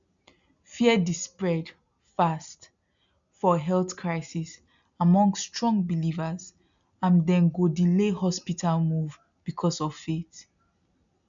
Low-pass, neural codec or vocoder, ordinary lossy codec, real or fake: 7.2 kHz; none; none; real